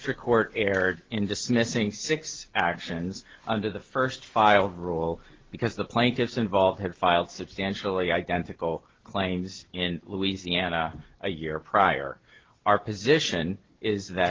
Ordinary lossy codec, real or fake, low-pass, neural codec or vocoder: Opus, 16 kbps; real; 7.2 kHz; none